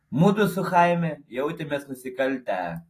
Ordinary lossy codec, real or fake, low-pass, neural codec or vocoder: AAC, 48 kbps; real; 14.4 kHz; none